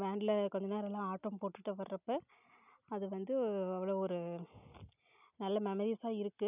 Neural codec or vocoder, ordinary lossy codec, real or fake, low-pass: none; none; real; 3.6 kHz